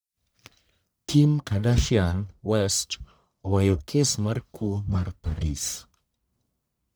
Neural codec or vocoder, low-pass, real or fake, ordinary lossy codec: codec, 44.1 kHz, 1.7 kbps, Pupu-Codec; none; fake; none